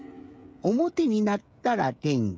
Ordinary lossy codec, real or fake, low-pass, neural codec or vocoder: none; fake; none; codec, 16 kHz, 16 kbps, FreqCodec, smaller model